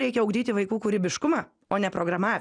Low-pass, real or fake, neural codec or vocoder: 9.9 kHz; real; none